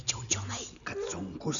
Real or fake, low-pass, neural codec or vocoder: real; 7.2 kHz; none